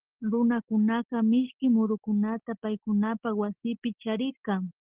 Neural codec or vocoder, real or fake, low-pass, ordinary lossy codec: none; real; 3.6 kHz; Opus, 16 kbps